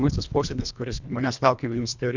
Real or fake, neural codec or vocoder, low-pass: fake; codec, 24 kHz, 1.5 kbps, HILCodec; 7.2 kHz